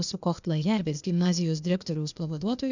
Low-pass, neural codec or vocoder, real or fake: 7.2 kHz; codec, 16 kHz, 0.8 kbps, ZipCodec; fake